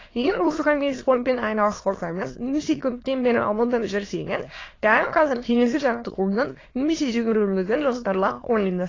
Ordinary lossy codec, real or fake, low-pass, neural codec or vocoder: AAC, 32 kbps; fake; 7.2 kHz; autoencoder, 22.05 kHz, a latent of 192 numbers a frame, VITS, trained on many speakers